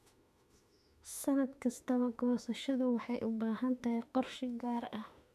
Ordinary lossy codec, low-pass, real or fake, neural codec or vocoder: none; 14.4 kHz; fake; autoencoder, 48 kHz, 32 numbers a frame, DAC-VAE, trained on Japanese speech